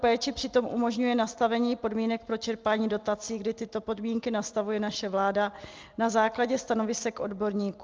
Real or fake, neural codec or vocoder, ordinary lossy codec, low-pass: real; none; Opus, 24 kbps; 7.2 kHz